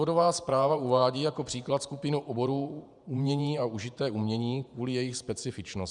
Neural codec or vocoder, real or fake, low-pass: vocoder, 48 kHz, 128 mel bands, Vocos; fake; 10.8 kHz